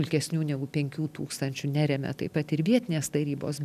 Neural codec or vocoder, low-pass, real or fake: none; 14.4 kHz; real